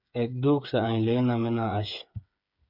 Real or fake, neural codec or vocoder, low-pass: fake; codec, 16 kHz, 8 kbps, FreqCodec, smaller model; 5.4 kHz